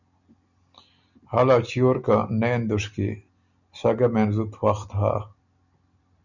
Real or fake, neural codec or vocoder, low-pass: real; none; 7.2 kHz